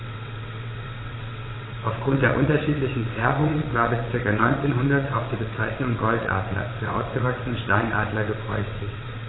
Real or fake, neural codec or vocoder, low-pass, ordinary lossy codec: fake; vocoder, 22.05 kHz, 80 mel bands, WaveNeXt; 7.2 kHz; AAC, 16 kbps